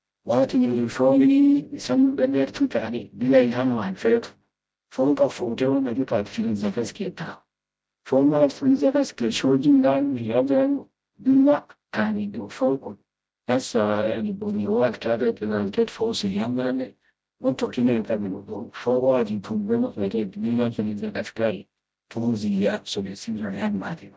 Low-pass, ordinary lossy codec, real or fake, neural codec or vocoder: none; none; fake; codec, 16 kHz, 0.5 kbps, FreqCodec, smaller model